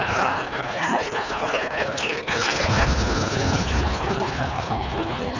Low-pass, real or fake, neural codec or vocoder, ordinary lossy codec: 7.2 kHz; fake; codec, 24 kHz, 1.5 kbps, HILCodec; none